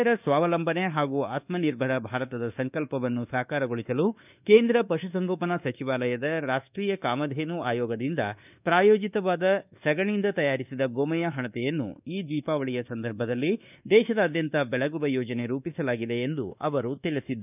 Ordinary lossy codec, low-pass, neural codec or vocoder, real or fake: none; 3.6 kHz; codec, 24 kHz, 1.2 kbps, DualCodec; fake